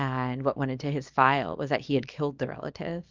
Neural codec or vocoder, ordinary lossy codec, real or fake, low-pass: codec, 24 kHz, 0.9 kbps, WavTokenizer, small release; Opus, 32 kbps; fake; 7.2 kHz